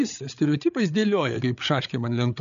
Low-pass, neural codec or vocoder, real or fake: 7.2 kHz; codec, 16 kHz, 8 kbps, FreqCodec, larger model; fake